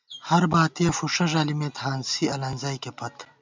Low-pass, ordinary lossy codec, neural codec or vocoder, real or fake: 7.2 kHz; MP3, 64 kbps; none; real